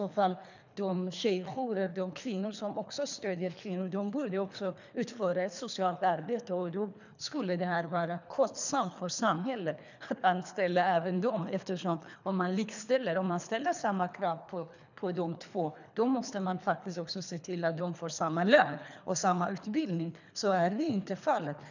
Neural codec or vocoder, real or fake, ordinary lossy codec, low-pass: codec, 24 kHz, 3 kbps, HILCodec; fake; none; 7.2 kHz